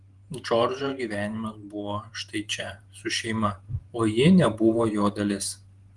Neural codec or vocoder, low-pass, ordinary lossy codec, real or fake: vocoder, 48 kHz, 128 mel bands, Vocos; 10.8 kHz; Opus, 24 kbps; fake